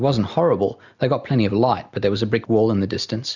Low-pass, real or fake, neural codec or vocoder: 7.2 kHz; real; none